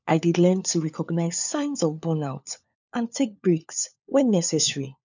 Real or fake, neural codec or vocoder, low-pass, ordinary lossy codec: fake; codec, 16 kHz, 4 kbps, FunCodec, trained on LibriTTS, 50 frames a second; 7.2 kHz; none